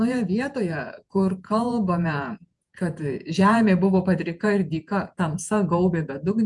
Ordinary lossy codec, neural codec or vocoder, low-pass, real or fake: MP3, 96 kbps; vocoder, 48 kHz, 128 mel bands, Vocos; 10.8 kHz; fake